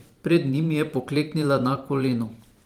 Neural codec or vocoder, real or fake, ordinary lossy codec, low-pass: vocoder, 44.1 kHz, 128 mel bands every 512 samples, BigVGAN v2; fake; Opus, 24 kbps; 19.8 kHz